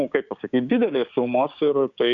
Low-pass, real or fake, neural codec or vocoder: 7.2 kHz; fake; codec, 16 kHz, 6 kbps, DAC